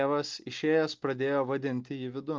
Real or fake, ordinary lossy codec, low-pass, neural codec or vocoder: real; Opus, 24 kbps; 7.2 kHz; none